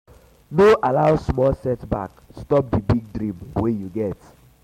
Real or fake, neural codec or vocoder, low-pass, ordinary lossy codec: real; none; 19.8 kHz; MP3, 64 kbps